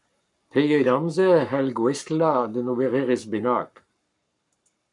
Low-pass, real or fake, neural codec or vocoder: 10.8 kHz; fake; codec, 44.1 kHz, 7.8 kbps, Pupu-Codec